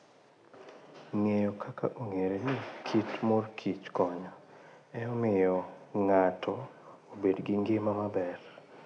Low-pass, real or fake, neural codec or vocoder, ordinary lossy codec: 9.9 kHz; real; none; none